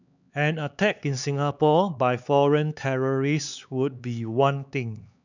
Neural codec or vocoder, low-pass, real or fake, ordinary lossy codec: codec, 16 kHz, 4 kbps, X-Codec, HuBERT features, trained on LibriSpeech; 7.2 kHz; fake; none